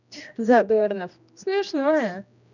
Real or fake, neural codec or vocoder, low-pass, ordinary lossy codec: fake; codec, 16 kHz, 1 kbps, X-Codec, HuBERT features, trained on general audio; 7.2 kHz; none